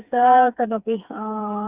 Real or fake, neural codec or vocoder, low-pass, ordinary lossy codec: fake; codec, 16 kHz, 4 kbps, FreqCodec, smaller model; 3.6 kHz; Opus, 64 kbps